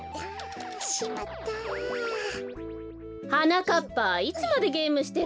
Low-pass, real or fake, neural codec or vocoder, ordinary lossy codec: none; real; none; none